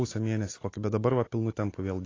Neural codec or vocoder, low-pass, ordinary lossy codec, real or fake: none; 7.2 kHz; AAC, 32 kbps; real